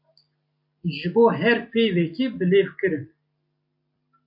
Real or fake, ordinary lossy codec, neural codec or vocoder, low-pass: real; AAC, 48 kbps; none; 5.4 kHz